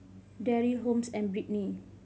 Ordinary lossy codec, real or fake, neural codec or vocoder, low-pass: none; real; none; none